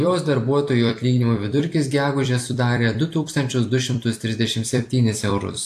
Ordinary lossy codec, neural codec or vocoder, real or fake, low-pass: AAC, 64 kbps; vocoder, 44.1 kHz, 128 mel bands every 256 samples, BigVGAN v2; fake; 14.4 kHz